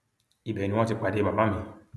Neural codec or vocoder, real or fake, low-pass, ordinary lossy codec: none; real; none; none